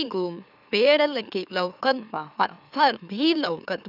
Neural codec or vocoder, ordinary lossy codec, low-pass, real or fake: autoencoder, 44.1 kHz, a latent of 192 numbers a frame, MeloTTS; none; 5.4 kHz; fake